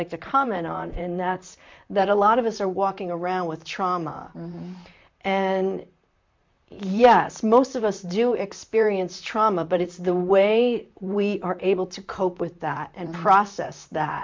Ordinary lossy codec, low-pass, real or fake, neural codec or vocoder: MP3, 64 kbps; 7.2 kHz; fake; vocoder, 44.1 kHz, 128 mel bands, Pupu-Vocoder